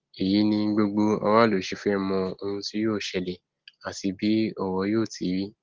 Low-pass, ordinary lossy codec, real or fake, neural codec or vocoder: 7.2 kHz; Opus, 16 kbps; real; none